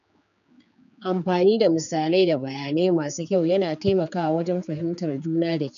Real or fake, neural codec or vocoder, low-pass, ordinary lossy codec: fake; codec, 16 kHz, 4 kbps, X-Codec, HuBERT features, trained on general audio; 7.2 kHz; none